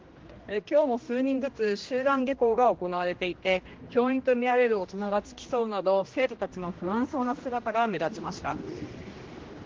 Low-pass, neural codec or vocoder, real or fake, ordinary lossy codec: 7.2 kHz; codec, 16 kHz, 1 kbps, X-Codec, HuBERT features, trained on general audio; fake; Opus, 16 kbps